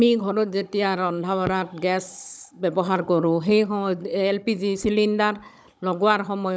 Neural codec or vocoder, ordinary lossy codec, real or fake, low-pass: codec, 16 kHz, 16 kbps, FunCodec, trained on Chinese and English, 50 frames a second; none; fake; none